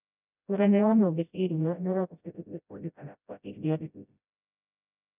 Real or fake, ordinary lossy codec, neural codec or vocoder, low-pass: fake; none; codec, 16 kHz, 0.5 kbps, FreqCodec, smaller model; 3.6 kHz